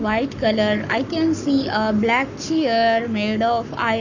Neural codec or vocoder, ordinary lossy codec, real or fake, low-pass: codec, 44.1 kHz, 7.8 kbps, Pupu-Codec; none; fake; 7.2 kHz